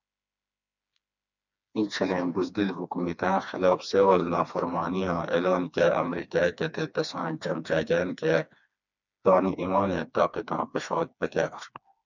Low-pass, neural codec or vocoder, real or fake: 7.2 kHz; codec, 16 kHz, 2 kbps, FreqCodec, smaller model; fake